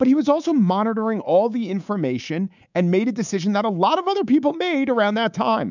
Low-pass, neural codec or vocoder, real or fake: 7.2 kHz; codec, 24 kHz, 3.1 kbps, DualCodec; fake